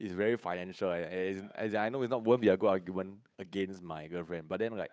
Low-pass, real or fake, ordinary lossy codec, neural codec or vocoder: none; fake; none; codec, 16 kHz, 8 kbps, FunCodec, trained on Chinese and English, 25 frames a second